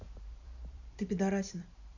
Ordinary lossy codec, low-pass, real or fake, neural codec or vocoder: none; 7.2 kHz; fake; vocoder, 44.1 kHz, 128 mel bands every 512 samples, BigVGAN v2